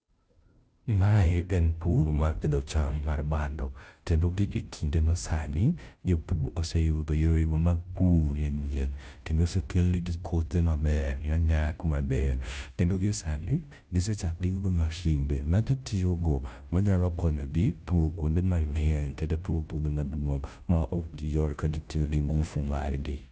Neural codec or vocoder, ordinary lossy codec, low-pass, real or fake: codec, 16 kHz, 0.5 kbps, FunCodec, trained on Chinese and English, 25 frames a second; none; none; fake